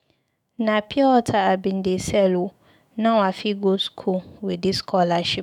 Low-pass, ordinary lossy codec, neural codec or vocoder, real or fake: 19.8 kHz; none; autoencoder, 48 kHz, 128 numbers a frame, DAC-VAE, trained on Japanese speech; fake